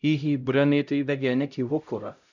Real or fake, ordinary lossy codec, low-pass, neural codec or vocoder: fake; none; 7.2 kHz; codec, 16 kHz, 0.5 kbps, X-Codec, HuBERT features, trained on LibriSpeech